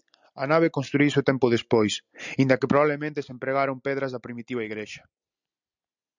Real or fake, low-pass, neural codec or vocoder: real; 7.2 kHz; none